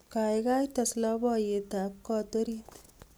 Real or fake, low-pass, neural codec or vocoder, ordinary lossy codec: real; none; none; none